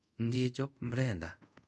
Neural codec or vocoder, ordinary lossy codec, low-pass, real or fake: codec, 24 kHz, 0.5 kbps, DualCodec; none; none; fake